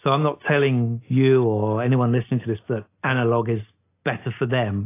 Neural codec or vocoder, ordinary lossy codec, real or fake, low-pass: none; AAC, 24 kbps; real; 3.6 kHz